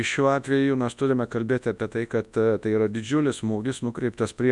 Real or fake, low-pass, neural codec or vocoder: fake; 10.8 kHz; codec, 24 kHz, 0.9 kbps, WavTokenizer, large speech release